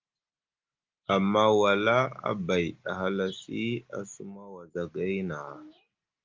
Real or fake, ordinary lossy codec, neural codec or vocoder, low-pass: real; Opus, 32 kbps; none; 7.2 kHz